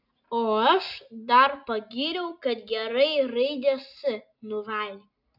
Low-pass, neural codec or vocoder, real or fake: 5.4 kHz; none; real